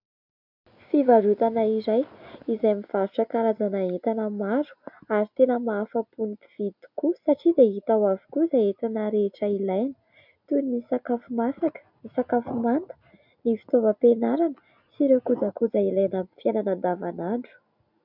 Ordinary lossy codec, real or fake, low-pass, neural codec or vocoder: MP3, 48 kbps; real; 5.4 kHz; none